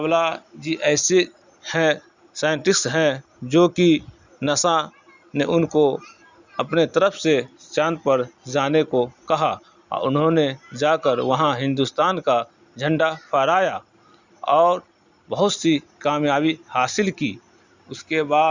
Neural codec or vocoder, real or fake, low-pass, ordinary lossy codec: none; real; 7.2 kHz; Opus, 64 kbps